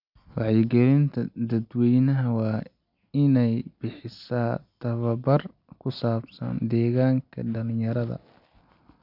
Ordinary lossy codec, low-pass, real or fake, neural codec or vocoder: none; 5.4 kHz; real; none